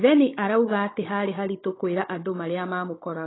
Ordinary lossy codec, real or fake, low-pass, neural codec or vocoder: AAC, 16 kbps; fake; 7.2 kHz; codec, 16 kHz, 16 kbps, FunCodec, trained on Chinese and English, 50 frames a second